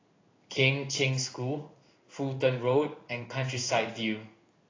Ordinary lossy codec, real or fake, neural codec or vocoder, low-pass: AAC, 32 kbps; fake; codec, 16 kHz in and 24 kHz out, 1 kbps, XY-Tokenizer; 7.2 kHz